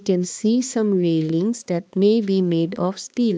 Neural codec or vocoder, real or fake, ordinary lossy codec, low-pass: codec, 16 kHz, 2 kbps, X-Codec, HuBERT features, trained on balanced general audio; fake; none; none